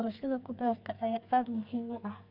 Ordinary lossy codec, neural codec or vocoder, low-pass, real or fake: none; codec, 44.1 kHz, 2.6 kbps, SNAC; 5.4 kHz; fake